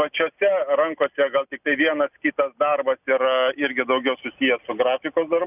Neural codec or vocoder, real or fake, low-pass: none; real; 3.6 kHz